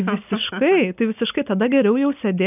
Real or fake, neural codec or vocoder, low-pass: real; none; 3.6 kHz